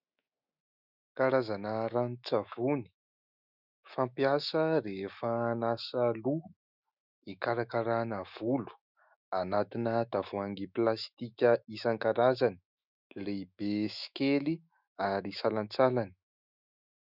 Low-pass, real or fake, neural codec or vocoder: 5.4 kHz; fake; autoencoder, 48 kHz, 128 numbers a frame, DAC-VAE, trained on Japanese speech